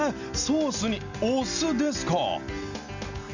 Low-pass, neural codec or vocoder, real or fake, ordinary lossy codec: 7.2 kHz; none; real; none